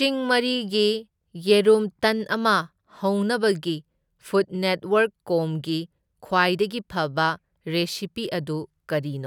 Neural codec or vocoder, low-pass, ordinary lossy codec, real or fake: none; 19.8 kHz; none; real